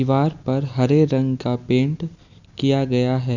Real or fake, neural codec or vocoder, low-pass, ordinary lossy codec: real; none; 7.2 kHz; none